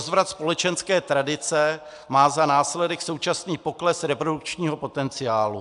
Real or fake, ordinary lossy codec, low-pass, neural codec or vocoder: real; AAC, 96 kbps; 10.8 kHz; none